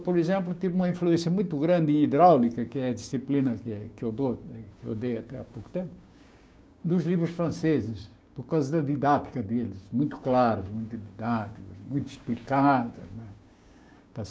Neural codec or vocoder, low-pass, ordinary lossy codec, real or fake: codec, 16 kHz, 6 kbps, DAC; none; none; fake